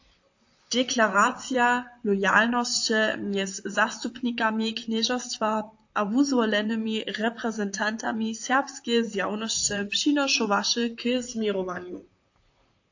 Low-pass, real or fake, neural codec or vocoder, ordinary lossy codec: 7.2 kHz; fake; vocoder, 44.1 kHz, 128 mel bands, Pupu-Vocoder; AAC, 48 kbps